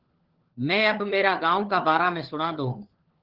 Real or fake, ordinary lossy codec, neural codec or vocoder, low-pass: fake; Opus, 16 kbps; codec, 16 kHz, 4 kbps, FunCodec, trained on LibriTTS, 50 frames a second; 5.4 kHz